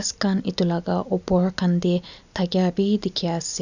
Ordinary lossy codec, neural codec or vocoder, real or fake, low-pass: none; none; real; 7.2 kHz